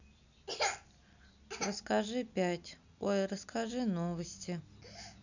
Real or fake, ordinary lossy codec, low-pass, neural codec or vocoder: real; none; 7.2 kHz; none